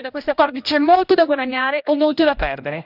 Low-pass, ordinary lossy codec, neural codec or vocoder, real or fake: 5.4 kHz; none; codec, 16 kHz, 1 kbps, X-Codec, HuBERT features, trained on general audio; fake